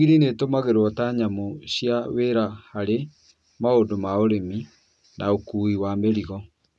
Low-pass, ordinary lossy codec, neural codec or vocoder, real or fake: none; none; none; real